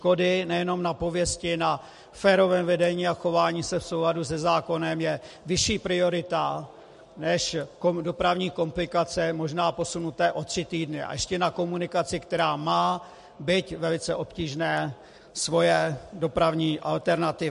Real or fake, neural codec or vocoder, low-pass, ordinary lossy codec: real; none; 14.4 kHz; MP3, 48 kbps